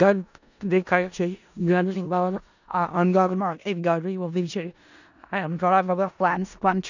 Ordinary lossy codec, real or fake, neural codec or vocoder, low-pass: none; fake; codec, 16 kHz in and 24 kHz out, 0.4 kbps, LongCat-Audio-Codec, four codebook decoder; 7.2 kHz